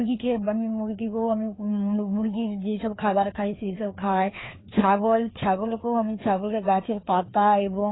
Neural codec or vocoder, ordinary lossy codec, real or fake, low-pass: codec, 16 kHz, 2 kbps, FreqCodec, larger model; AAC, 16 kbps; fake; 7.2 kHz